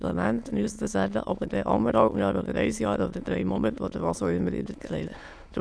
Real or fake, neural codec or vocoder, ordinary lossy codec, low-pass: fake; autoencoder, 22.05 kHz, a latent of 192 numbers a frame, VITS, trained on many speakers; none; none